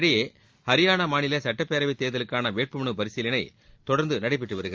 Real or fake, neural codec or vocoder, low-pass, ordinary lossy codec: real; none; 7.2 kHz; Opus, 32 kbps